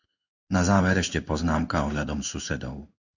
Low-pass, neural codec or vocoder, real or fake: 7.2 kHz; codec, 16 kHz in and 24 kHz out, 1 kbps, XY-Tokenizer; fake